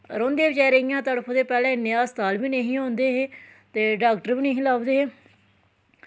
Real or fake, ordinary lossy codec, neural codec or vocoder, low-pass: real; none; none; none